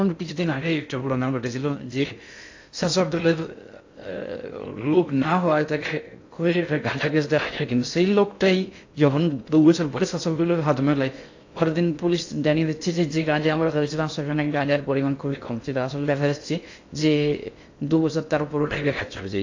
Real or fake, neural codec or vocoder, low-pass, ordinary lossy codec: fake; codec, 16 kHz in and 24 kHz out, 0.6 kbps, FocalCodec, streaming, 2048 codes; 7.2 kHz; AAC, 48 kbps